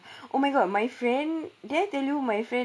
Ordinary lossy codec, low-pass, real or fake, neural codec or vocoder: none; none; real; none